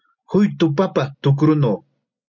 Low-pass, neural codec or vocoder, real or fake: 7.2 kHz; none; real